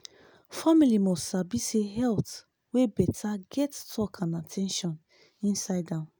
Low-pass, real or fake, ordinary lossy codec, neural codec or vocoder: none; real; none; none